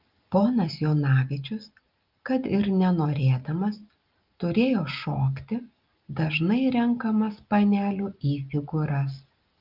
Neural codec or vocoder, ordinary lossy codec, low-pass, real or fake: none; Opus, 24 kbps; 5.4 kHz; real